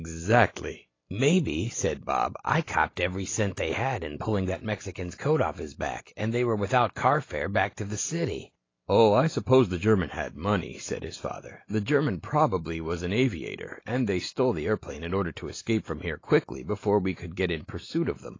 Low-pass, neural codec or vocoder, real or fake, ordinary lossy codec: 7.2 kHz; none; real; AAC, 32 kbps